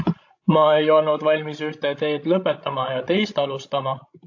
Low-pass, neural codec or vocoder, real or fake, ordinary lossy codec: 7.2 kHz; codec, 16 kHz, 16 kbps, FreqCodec, larger model; fake; AAC, 48 kbps